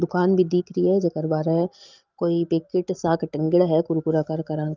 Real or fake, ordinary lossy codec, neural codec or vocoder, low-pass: real; Opus, 32 kbps; none; 7.2 kHz